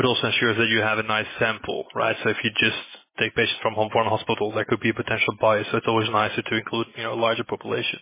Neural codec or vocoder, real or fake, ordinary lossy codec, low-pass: none; real; MP3, 16 kbps; 3.6 kHz